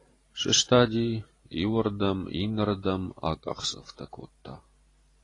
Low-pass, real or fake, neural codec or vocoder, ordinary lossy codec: 10.8 kHz; fake; vocoder, 44.1 kHz, 128 mel bands every 512 samples, BigVGAN v2; AAC, 32 kbps